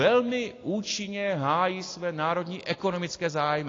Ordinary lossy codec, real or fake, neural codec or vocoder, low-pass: AAC, 32 kbps; real; none; 7.2 kHz